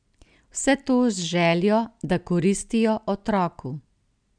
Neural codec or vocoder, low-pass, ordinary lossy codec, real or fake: none; 9.9 kHz; none; real